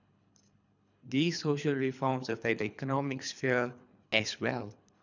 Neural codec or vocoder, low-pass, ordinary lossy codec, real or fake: codec, 24 kHz, 3 kbps, HILCodec; 7.2 kHz; none; fake